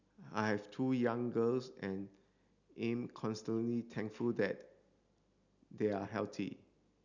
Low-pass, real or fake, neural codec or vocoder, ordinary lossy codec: 7.2 kHz; real; none; none